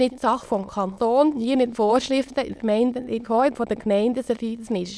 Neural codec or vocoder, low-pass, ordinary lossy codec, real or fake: autoencoder, 22.05 kHz, a latent of 192 numbers a frame, VITS, trained on many speakers; none; none; fake